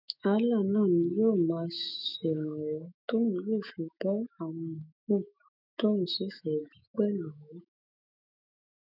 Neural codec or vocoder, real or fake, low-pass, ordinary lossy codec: autoencoder, 48 kHz, 128 numbers a frame, DAC-VAE, trained on Japanese speech; fake; 5.4 kHz; none